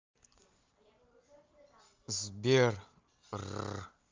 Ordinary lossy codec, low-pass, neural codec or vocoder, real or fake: Opus, 24 kbps; 7.2 kHz; none; real